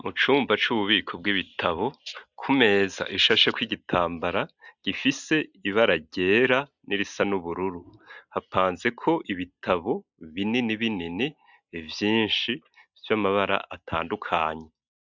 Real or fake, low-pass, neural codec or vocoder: real; 7.2 kHz; none